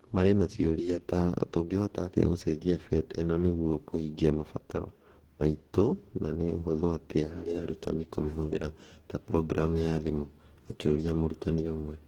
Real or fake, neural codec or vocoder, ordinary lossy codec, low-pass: fake; codec, 44.1 kHz, 2.6 kbps, DAC; Opus, 16 kbps; 19.8 kHz